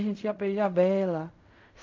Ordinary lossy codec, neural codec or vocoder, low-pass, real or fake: MP3, 64 kbps; codec, 16 kHz in and 24 kHz out, 0.4 kbps, LongCat-Audio-Codec, fine tuned four codebook decoder; 7.2 kHz; fake